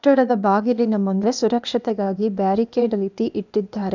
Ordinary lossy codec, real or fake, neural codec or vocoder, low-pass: none; fake; codec, 16 kHz, 0.8 kbps, ZipCodec; 7.2 kHz